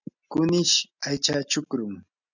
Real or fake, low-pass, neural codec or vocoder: real; 7.2 kHz; none